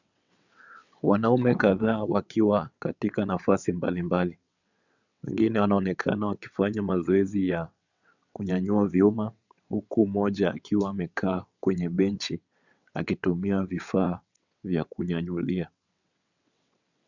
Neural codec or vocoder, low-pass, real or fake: vocoder, 22.05 kHz, 80 mel bands, WaveNeXt; 7.2 kHz; fake